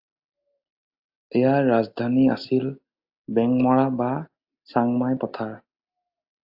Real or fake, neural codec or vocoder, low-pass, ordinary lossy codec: real; none; 5.4 kHz; AAC, 48 kbps